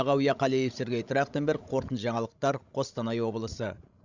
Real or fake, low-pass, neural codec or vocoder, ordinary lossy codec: fake; 7.2 kHz; codec, 16 kHz, 16 kbps, FreqCodec, larger model; Opus, 64 kbps